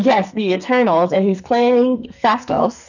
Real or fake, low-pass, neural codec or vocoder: fake; 7.2 kHz; codec, 44.1 kHz, 2.6 kbps, SNAC